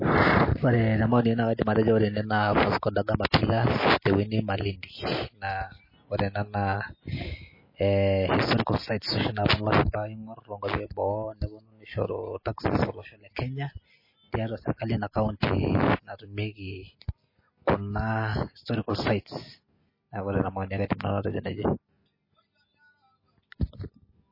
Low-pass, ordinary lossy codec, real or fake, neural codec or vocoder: 5.4 kHz; MP3, 24 kbps; real; none